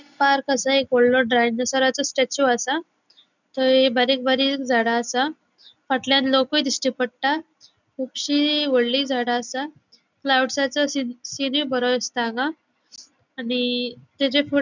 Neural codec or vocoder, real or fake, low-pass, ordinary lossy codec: none; real; 7.2 kHz; none